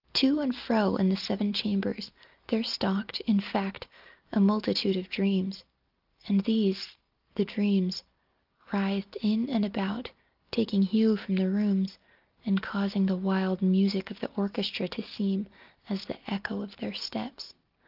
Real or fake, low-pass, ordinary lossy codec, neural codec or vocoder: real; 5.4 kHz; Opus, 32 kbps; none